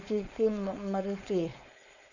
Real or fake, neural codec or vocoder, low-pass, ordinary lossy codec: fake; codec, 16 kHz, 4.8 kbps, FACodec; 7.2 kHz; none